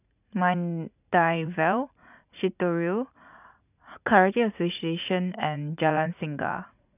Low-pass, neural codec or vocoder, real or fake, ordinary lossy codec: 3.6 kHz; vocoder, 44.1 kHz, 80 mel bands, Vocos; fake; none